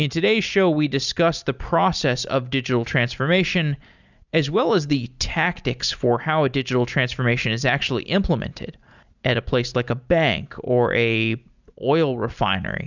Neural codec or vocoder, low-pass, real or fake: none; 7.2 kHz; real